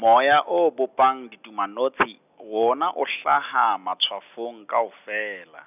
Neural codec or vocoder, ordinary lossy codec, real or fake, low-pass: none; none; real; 3.6 kHz